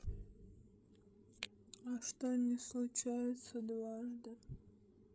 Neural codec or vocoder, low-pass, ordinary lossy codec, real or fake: codec, 16 kHz, 16 kbps, FreqCodec, larger model; none; none; fake